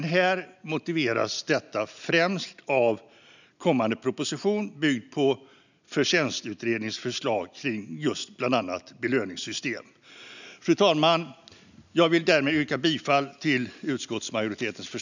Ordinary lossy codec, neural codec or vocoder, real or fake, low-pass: none; none; real; 7.2 kHz